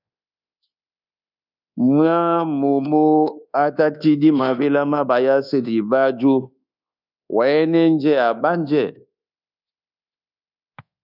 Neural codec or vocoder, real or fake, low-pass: codec, 24 kHz, 1.2 kbps, DualCodec; fake; 5.4 kHz